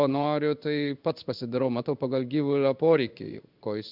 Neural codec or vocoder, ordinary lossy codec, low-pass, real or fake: codec, 16 kHz in and 24 kHz out, 1 kbps, XY-Tokenizer; Opus, 64 kbps; 5.4 kHz; fake